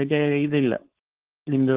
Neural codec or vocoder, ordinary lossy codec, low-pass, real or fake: codec, 16 kHz, 4.8 kbps, FACodec; Opus, 16 kbps; 3.6 kHz; fake